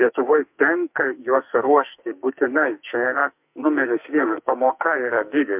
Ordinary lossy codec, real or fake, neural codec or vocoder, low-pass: MP3, 32 kbps; fake; codec, 44.1 kHz, 2.6 kbps, SNAC; 3.6 kHz